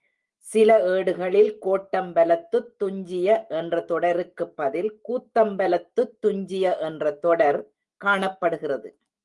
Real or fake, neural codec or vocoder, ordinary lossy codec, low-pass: fake; autoencoder, 48 kHz, 128 numbers a frame, DAC-VAE, trained on Japanese speech; Opus, 24 kbps; 10.8 kHz